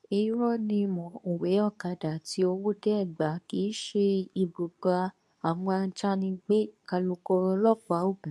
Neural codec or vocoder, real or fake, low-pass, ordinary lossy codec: codec, 24 kHz, 0.9 kbps, WavTokenizer, medium speech release version 2; fake; none; none